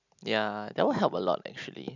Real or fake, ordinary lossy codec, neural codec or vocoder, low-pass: real; none; none; 7.2 kHz